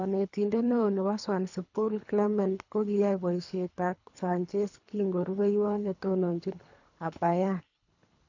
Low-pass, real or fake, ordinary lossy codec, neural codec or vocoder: 7.2 kHz; fake; none; codec, 24 kHz, 3 kbps, HILCodec